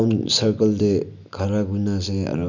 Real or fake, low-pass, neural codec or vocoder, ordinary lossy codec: real; 7.2 kHz; none; none